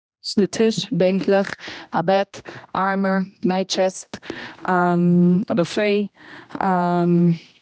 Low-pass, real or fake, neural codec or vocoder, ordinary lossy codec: none; fake; codec, 16 kHz, 1 kbps, X-Codec, HuBERT features, trained on general audio; none